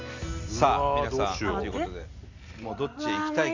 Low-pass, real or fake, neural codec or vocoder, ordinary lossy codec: 7.2 kHz; real; none; AAC, 48 kbps